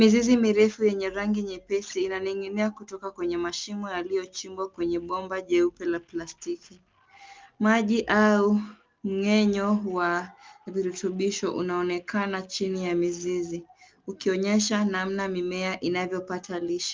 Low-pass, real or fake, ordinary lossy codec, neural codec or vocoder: 7.2 kHz; real; Opus, 24 kbps; none